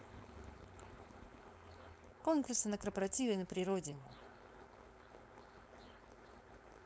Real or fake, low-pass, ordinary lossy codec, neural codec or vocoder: fake; none; none; codec, 16 kHz, 4.8 kbps, FACodec